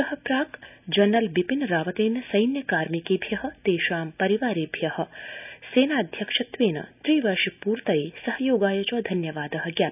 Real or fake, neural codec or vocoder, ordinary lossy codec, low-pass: real; none; none; 3.6 kHz